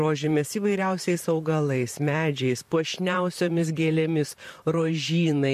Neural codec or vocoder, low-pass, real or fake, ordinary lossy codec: vocoder, 44.1 kHz, 128 mel bands, Pupu-Vocoder; 14.4 kHz; fake; MP3, 64 kbps